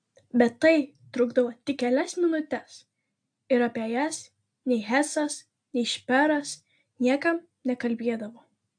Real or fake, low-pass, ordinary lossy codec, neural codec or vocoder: real; 9.9 kHz; AAC, 64 kbps; none